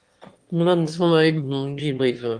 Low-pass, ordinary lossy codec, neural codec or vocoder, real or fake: 9.9 kHz; Opus, 24 kbps; autoencoder, 22.05 kHz, a latent of 192 numbers a frame, VITS, trained on one speaker; fake